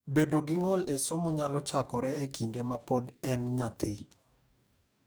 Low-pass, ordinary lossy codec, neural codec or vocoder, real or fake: none; none; codec, 44.1 kHz, 2.6 kbps, DAC; fake